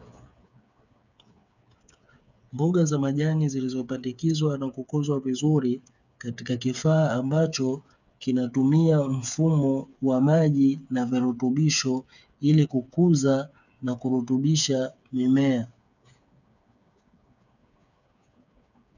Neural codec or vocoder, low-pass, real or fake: codec, 16 kHz, 8 kbps, FreqCodec, smaller model; 7.2 kHz; fake